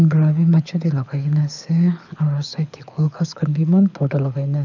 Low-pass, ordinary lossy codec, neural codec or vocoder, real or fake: 7.2 kHz; none; codec, 24 kHz, 6 kbps, HILCodec; fake